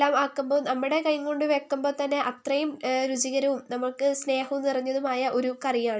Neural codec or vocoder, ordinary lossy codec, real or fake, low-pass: none; none; real; none